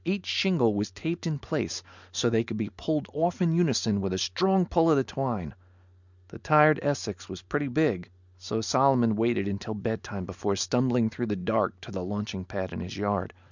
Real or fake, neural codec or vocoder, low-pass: real; none; 7.2 kHz